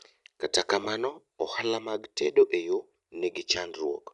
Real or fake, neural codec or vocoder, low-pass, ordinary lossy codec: real; none; 10.8 kHz; none